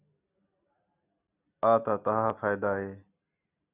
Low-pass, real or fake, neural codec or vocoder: 3.6 kHz; real; none